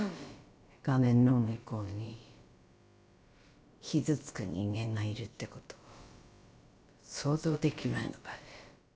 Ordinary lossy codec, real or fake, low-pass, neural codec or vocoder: none; fake; none; codec, 16 kHz, about 1 kbps, DyCAST, with the encoder's durations